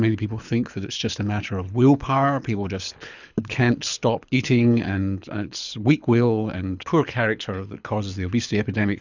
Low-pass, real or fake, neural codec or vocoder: 7.2 kHz; fake; codec, 24 kHz, 6 kbps, HILCodec